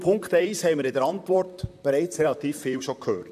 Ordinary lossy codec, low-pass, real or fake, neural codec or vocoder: none; 14.4 kHz; fake; vocoder, 44.1 kHz, 128 mel bands, Pupu-Vocoder